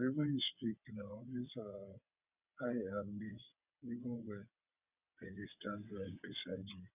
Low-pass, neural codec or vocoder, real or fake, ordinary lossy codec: 3.6 kHz; vocoder, 22.05 kHz, 80 mel bands, WaveNeXt; fake; none